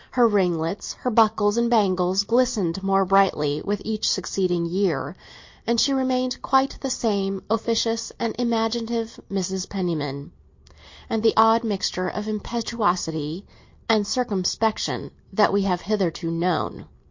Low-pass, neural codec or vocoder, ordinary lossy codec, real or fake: 7.2 kHz; none; MP3, 48 kbps; real